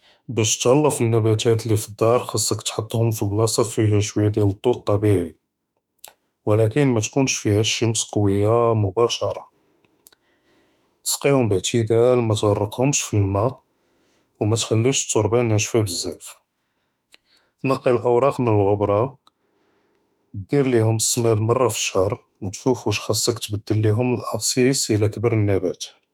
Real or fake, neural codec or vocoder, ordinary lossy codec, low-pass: fake; autoencoder, 48 kHz, 32 numbers a frame, DAC-VAE, trained on Japanese speech; none; 19.8 kHz